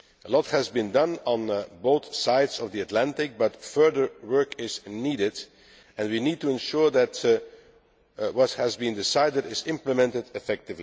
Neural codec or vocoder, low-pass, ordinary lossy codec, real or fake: none; none; none; real